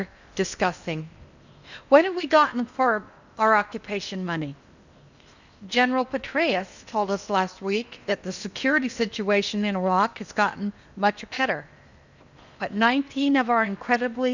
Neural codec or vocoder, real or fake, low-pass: codec, 16 kHz in and 24 kHz out, 0.8 kbps, FocalCodec, streaming, 65536 codes; fake; 7.2 kHz